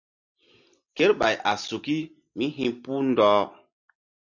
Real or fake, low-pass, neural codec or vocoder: real; 7.2 kHz; none